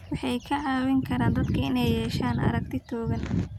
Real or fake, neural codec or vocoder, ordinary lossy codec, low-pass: real; none; none; 19.8 kHz